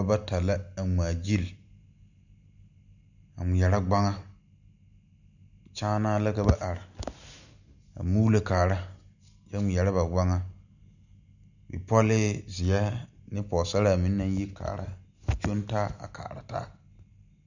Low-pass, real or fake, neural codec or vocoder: 7.2 kHz; real; none